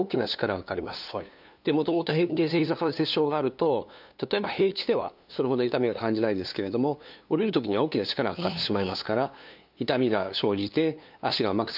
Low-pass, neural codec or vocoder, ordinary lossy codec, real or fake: 5.4 kHz; codec, 16 kHz, 2 kbps, FunCodec, trained on LibriTTS, 25 frames a second; none; fake